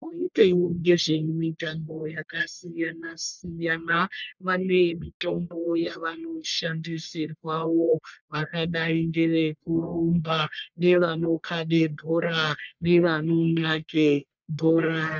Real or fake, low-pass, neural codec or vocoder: fake; 7.2 kHz; codec, 44.1 kHz, 1.7 kbps, Pupu-Codec